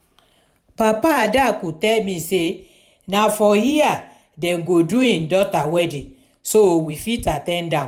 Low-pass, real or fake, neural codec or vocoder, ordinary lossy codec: 19.8 kHz; fake; vocoder, 44.1 kHz, 128 mel bands every 512 samples, BigVGAN v2; none